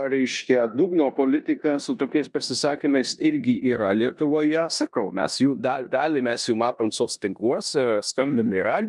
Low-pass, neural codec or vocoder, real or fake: 10.8 kHz; codec, 16 kHz in and 24 kHz out, 0.9 kbps, LongCat-Audio-Codec, four codebook decoder; fake